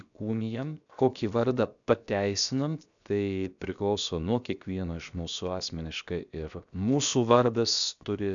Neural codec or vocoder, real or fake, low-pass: codec, 16 kHz, 0.7 kbps, FocalCodec; fake; 7.2 kHz